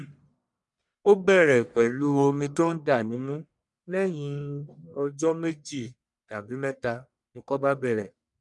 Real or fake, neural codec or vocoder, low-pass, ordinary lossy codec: fake; codec, 44.1 kHz, 1.7 kbps, Pupu-Codec; 10.8 kHz; none